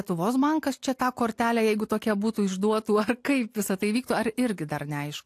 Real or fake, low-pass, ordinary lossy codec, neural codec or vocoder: real; 14.4 kHz; AAC, 64 kbps; none